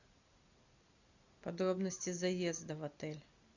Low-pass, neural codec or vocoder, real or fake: 7.2 kHz; none; real